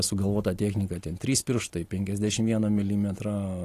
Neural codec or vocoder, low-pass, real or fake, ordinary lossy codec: none; 14.4 kHz; real; AAC, 48 kbps